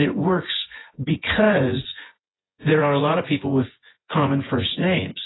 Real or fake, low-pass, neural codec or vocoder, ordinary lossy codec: fake; 7.2 kHz; vocoder, 24 kHz, 100 mel bands, Vocos; AAC, 16 kbps